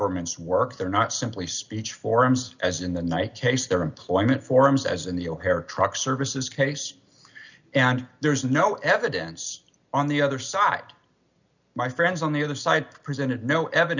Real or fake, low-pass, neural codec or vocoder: real; 7.2 kHz; none